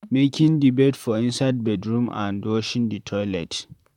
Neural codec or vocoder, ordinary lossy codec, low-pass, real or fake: vocoder, 44.1 kHz, 128 mel bands, Pupu-Vocoder; none; 19.8 kHz; fake